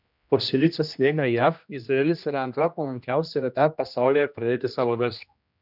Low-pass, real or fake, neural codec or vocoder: 5.4 kHz; fake; codec, 16 kHz, 1 kbps, X-Codec, HuBERT features, trained on general audio